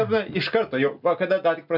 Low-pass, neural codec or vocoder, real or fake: 5.4 kHz; none; real